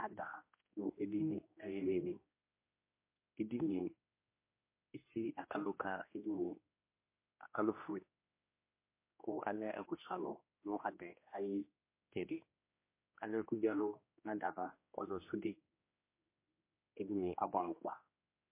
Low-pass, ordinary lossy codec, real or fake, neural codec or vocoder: 3.6 kHz; MP3, 24 kbps; fake; codec, 16 kHz, 1 kbps, X-Codec, HuBERT features, trained on general audio